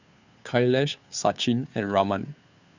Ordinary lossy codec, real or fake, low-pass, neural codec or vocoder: Opus, 64 kbps; fake; 7.2 kHz; codec, 16 kHz, 2 kbps, FunCodec, trained on Chinese and English, 25 frames a second